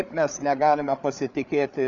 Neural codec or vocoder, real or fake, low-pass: codec, 16 kHz, 8 kbps, FreqCodec, larger model; fake; 7.2 kHz